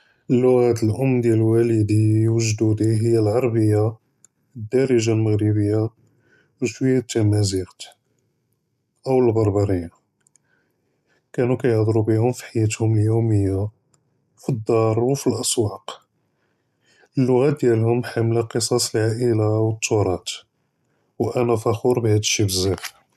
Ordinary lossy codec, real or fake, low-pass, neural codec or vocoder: none; real; 14.4 kHz; none